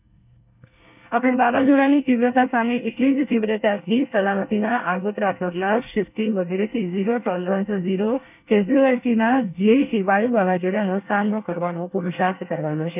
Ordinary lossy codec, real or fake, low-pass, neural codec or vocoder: none; fake; 3.6 kHz; codec, 24 kHz, 1 kbps, SNAC